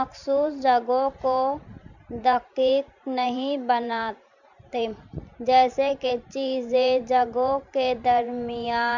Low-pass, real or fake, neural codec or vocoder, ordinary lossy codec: 7.2 kHz; fake; vocoder, 44.1 kHz, 128 mel bands every 512 samples, BigVGAN v2; none